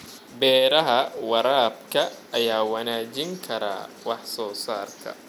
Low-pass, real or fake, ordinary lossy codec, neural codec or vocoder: 19.8 kHz; real; none; none